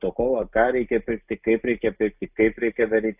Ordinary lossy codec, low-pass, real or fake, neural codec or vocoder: AAC, 32 kbps; 3.6 kHz; real; none